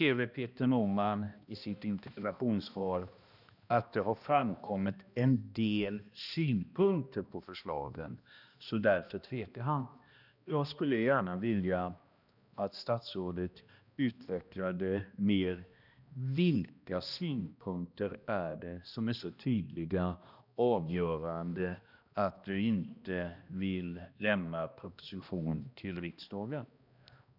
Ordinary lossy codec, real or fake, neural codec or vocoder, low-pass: none; fake; codec, 16 kHz, 1 kbps, X-Codec, HuBERT features, trained on balanced general audio; 5.4 kHz